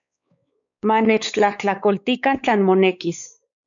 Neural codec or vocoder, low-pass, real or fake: codec, 16 kHz, 4 kbps, X-Codec, WavLM features, trained on Multilingual LibriSpeech; 7.2 kHz; fake